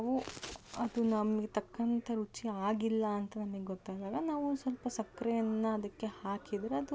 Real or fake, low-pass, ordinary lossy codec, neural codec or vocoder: real; none; none; none